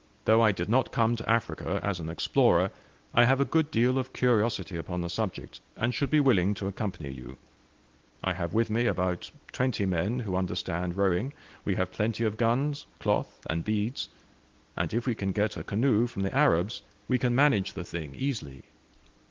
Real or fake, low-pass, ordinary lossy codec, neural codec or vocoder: real; 7.2 kHz; Opus, 16 kbps; none